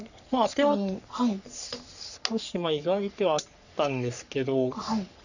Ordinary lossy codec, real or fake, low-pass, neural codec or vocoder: none; fake; 7.2 kHz; codec, 44.1 kHz, 3.4 kbps, Pupu-Codec